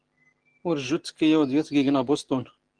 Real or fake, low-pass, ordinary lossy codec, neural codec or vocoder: real; 9.9 kHz; Opus, 16 kbps; none